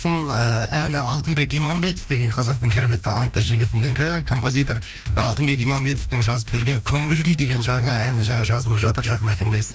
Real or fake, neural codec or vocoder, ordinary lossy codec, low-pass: fake; codec, 16 kHz, 1 kbps, FreqCodec, larger model; none; none